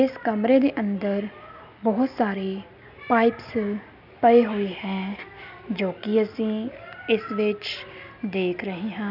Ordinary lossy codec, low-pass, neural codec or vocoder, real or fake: none; 5.4 kHz; none; real